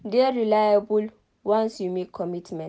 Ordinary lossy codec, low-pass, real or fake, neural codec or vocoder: none; none; real; none